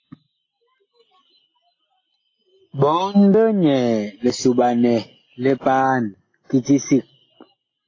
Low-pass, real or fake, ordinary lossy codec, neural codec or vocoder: 7.2 kHz; real; AAC, 32 kbps; none